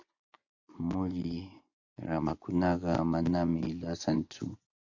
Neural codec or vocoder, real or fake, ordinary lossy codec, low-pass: vocoder, 24 kHz, 100 mel bands, Vocos; fake; AAC, 48 kbps; 7.2 kHz